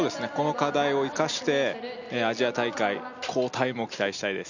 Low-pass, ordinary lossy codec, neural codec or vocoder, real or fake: 7.2 kHz; none; none; real